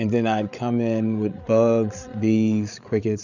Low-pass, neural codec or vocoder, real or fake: 7.2 kHz; codec, 16 kHz, 8 kbps, FreqCodec, larger model; fake